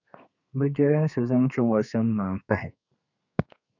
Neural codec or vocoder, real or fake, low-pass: codec, 32 kHz, 1.9 kbps, SNAC; fake; 7.2 kHz